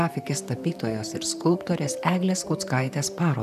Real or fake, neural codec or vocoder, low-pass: fake; vocoder, 44.1 kHz, 128 mel bands, Pupu-Vocoder; 14.4 kHz